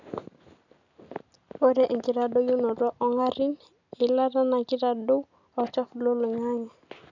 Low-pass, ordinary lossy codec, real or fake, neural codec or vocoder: 7.2 kHz; none; real; none